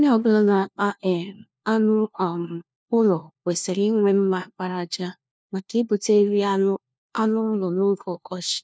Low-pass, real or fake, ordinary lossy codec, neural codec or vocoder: none; fake; none; codec, 16 kHz, 1 kbps, FunCodec, trained on LibriTTS, 50 frames a second